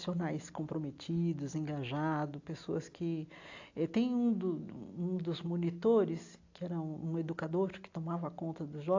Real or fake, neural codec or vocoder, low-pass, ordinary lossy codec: real; none; 7.2 kHz; AAC, 48 kbps